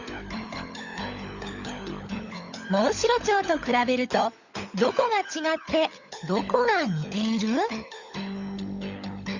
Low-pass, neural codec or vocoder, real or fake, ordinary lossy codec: 7.2 kHz; codec, 16 kHz, 16 kbps, FunCodec, trained on LibriTTS, 50 frames a second; fake; Opus, 64 kbps